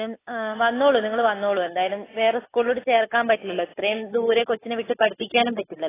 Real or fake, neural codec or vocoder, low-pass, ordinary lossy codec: real; none; 3.6 kHz; AAC, 16 kbps